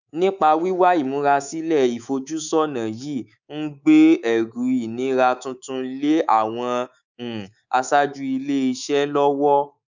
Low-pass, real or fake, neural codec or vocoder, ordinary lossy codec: 7.2 kHz; fake; codec, 24 kHz, 3.1 kbps, DualCodec; none